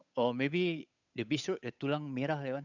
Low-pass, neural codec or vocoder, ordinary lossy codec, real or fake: 7.2 kHz; codec, 16 kHz, 8 kbps, FunCodec, trained on Chinese and English, 25 frames a second; none; fake